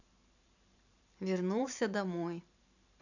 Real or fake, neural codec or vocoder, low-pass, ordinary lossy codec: fake; vocoder, 44.1 kHz, 128 mel bands every 512 samples, BigVGAN v2; 7.2 kHz; none